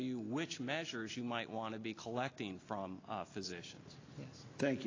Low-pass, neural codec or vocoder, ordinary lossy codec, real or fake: 7.2 kHz; none; AAC, 32 kbps; real